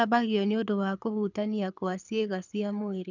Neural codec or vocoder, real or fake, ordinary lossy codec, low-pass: codec, 24 kHz, 6 kbps, HILCodec; fake; none; 7.2 kHz